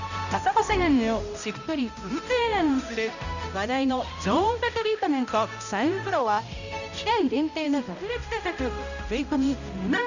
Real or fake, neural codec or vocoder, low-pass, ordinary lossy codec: fake; codec, 16 kHz, 0.5 kbps, X-Codec, HuBERT features, trained on balanced general audio; 7.2 kHz; none